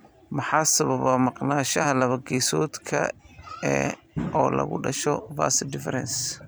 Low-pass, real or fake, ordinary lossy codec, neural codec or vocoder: none; fake; none; vocoder, 44.1 kHz, 128 mel bands every 256 samples, BigVGAN v2